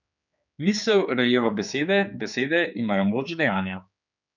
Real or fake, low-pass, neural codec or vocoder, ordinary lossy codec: fake; 7.2 kHz; codec, 16 kHz, 4 kbps, X-Codec, HuBERT features, trained on general audio; none